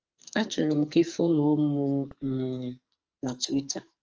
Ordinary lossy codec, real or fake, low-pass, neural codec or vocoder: Opus, 24 kbps; fake; 7.2 kHz; codec, 44.1 kHz, 2.6 kbps, SNAC